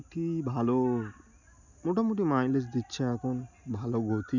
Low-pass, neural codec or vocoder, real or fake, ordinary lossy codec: 7.2 kHz; none; real; none